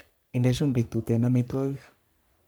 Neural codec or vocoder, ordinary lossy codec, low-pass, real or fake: codec, 44.1 kHz, 3.4 kbps, Pupu-Codec; none; none; fake